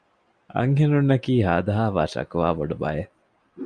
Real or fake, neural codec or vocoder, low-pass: real; none; 9.9 kHz